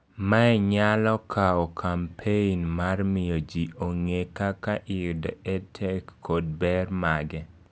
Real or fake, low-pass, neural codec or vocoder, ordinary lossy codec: real; none; none; none